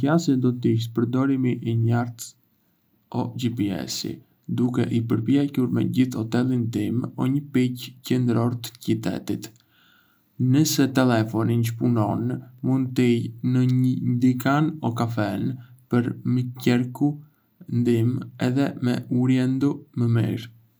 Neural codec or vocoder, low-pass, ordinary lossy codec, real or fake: none; none; none; real